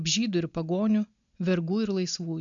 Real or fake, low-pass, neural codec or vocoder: real; 7.2 kHz; none